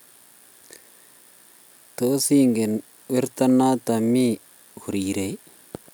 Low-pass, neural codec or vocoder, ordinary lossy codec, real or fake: none; none; none; real